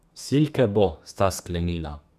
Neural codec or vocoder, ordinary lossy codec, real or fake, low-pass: codec, 32 kHz, 1.9 kbps, SNAC; none; fake; 14.4 kHz